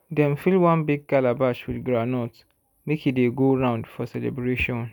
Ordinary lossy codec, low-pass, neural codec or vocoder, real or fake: none; 19.8 kHz; none; real